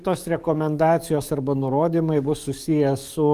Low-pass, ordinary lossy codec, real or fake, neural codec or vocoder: 14.4 kHz; Opus, 32 kbps; fake; codec, 44.1 kHz, 7.8 kbps, DAC